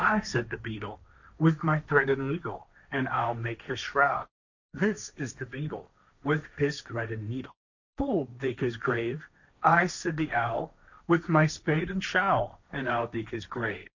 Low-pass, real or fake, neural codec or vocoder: 7.2 kHz; fake; codec, 16 kHz, 1.1 kbps, Voila-Tokenizer